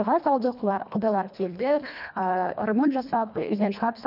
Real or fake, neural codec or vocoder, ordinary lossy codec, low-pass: fake; codec, 24 kHz, 1.5 kbps, HILCodec; none; 5.4 kHz